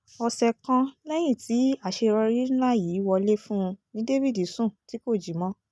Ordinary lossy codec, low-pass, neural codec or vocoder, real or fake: none; none; none; real